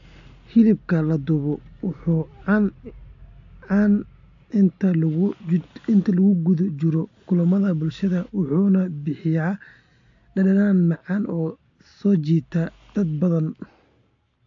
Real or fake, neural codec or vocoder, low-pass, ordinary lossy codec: real; none; 7.2 kHz; AAC, 48 kbps